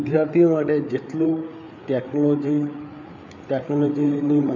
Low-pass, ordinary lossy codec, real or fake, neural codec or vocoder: 7.2 kHz; none; fake; codec, 16 kHz, 8 kbps, FreqCodec, larger model